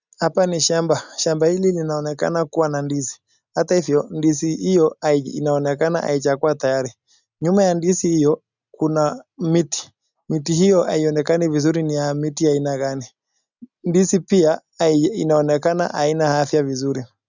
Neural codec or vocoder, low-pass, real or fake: none; 7.2 kHz; real